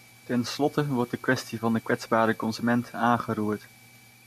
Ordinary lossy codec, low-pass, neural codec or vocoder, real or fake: MP3, 64 kbps; 14.4 kHz; none; real